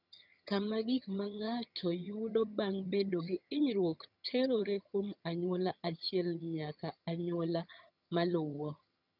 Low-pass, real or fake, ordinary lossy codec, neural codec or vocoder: 5.4 kHz; fake; none; vocoder, 22.05 kHz, 80 mel bands, HiFi-GAN